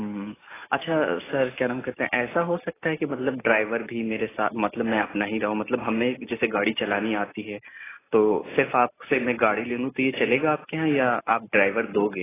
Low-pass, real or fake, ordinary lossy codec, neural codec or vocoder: 3.6 kHz; real; AAC, 16 kbps; none